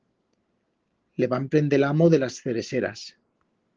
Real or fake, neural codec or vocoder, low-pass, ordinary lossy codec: real; none; 7.2 kHz; Opus, 16 kbps